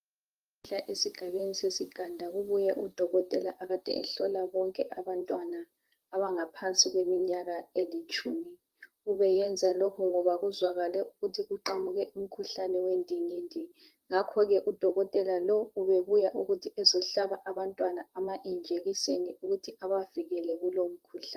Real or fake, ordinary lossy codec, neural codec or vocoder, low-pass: fake; Opus, 32 kbps; vocoder, 44.1 kHz, 128 mel bands, Pupu-Vocoder; 14.4 kHz